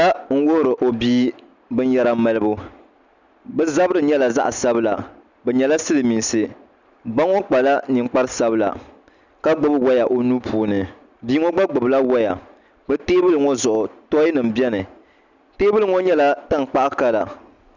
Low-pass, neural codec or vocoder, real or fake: 7.2 kHz; none; real